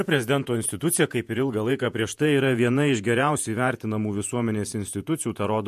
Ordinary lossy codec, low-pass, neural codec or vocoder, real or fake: MP3, 64 kbps; 14.4 kHz; vocoder, 48 kHz, 128 mel bands, Vocos; fake